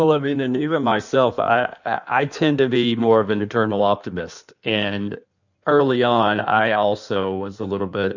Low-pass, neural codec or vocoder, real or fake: 7.2 kHz; codec, 16 kHz in and 24 kHz out, 1.1 kbps, FireRedTTS-2 codec; fake